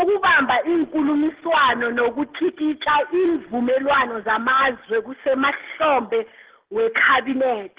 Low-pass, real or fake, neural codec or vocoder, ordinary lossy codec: 3.6 kHz; real; none; Opus, 16 kbps